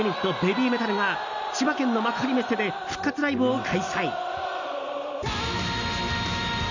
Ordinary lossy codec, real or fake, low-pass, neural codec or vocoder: none; real; 7.2 kHz; none